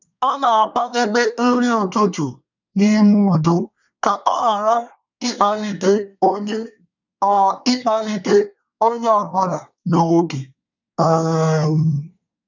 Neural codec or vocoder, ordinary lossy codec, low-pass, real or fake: codec, 24 kHz, 1 kbps, SNAC; none; 7.2 kHz; fake